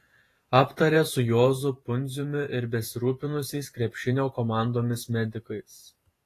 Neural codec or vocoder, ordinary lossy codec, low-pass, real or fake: vocoder, 48 kHz, 128 mel bands, Vocos; AAC, 48 kbps; 14.4 kHz; fake